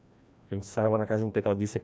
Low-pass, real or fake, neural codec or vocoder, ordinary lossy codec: none; fake; codec, 16 kHz, 1 kbps, FreqCodec, larger model; none